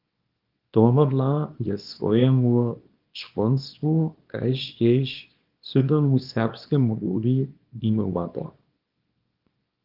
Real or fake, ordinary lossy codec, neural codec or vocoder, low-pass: fake; Opus, 24 kbps; codec, 24 kHz, 0.9 kbps, WavTokenizer, small release; 5.4 kHz